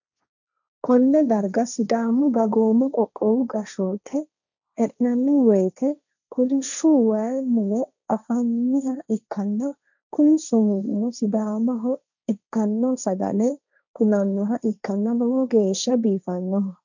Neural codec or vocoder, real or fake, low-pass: codec, 16 kHz, 1.1 kbps, Voila-Tokenizer; fake; 7.2 kHz